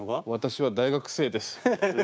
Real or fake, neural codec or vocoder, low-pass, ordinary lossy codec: fake; codec, 16 kHz, 6 kbps, DAC; none; none